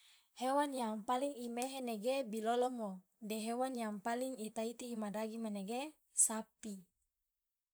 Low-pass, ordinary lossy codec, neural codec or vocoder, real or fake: none; none; vocoder, 44.1 kHz, 128 mel bands, Pupu-Vocoder; fake